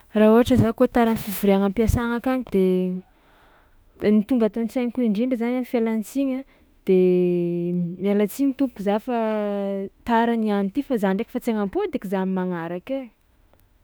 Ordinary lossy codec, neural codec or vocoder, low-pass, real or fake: none; autoencoder, 48 kHz, 32 numbers a frame, DAC-VAE, trained on Japanese speech; none; fake